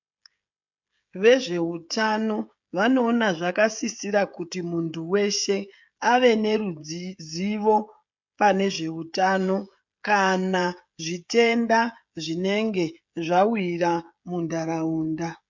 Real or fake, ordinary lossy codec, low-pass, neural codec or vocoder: fake; MP3, 64 kbps; 7.2 kHz; codec, 16 kHz, 16 kbps, FreqCodec, smaller model